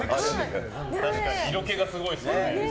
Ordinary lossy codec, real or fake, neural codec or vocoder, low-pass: none; real; none; none